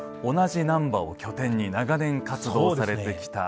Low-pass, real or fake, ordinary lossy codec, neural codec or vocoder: none; real; none; none